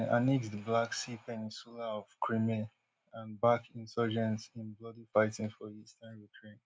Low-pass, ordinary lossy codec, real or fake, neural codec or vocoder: none; none; real; none